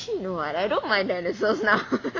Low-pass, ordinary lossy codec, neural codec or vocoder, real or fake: 7.2 kHz; AAC, 32 kbps; none; real